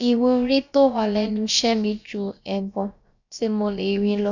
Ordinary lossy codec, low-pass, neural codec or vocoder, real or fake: none; 7.2 kHz; codec, 16 kHz, about 1 kbps, DyCAST, with the encoder's durations; fake